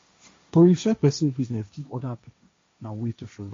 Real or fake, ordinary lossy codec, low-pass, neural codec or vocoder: fake; MP3, 48 kbps; 7.2 kHz; codec, 16 kHz, 1.1 kbps, Voila-Tokenizer